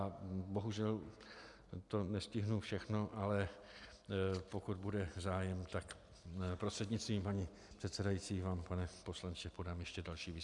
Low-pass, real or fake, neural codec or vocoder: 10.8 kHz; real; none